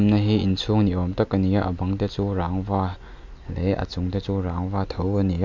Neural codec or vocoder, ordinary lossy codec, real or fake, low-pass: none; MP3, 64 kbps; real; 7.2 kHz